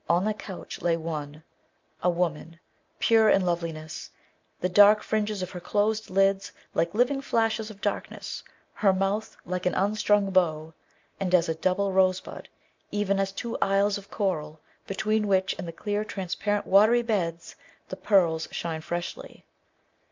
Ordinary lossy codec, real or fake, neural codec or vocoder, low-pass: MP3, 64 kbps; real; none; 7.2 kHz